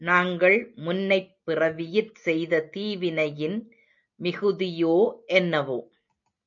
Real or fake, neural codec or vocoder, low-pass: real; none; 7.2 kHz